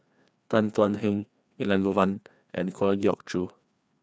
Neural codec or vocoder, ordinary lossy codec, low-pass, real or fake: codec, 16 kHz, 2 kbps, FreqCodec, larger model; none; none; fake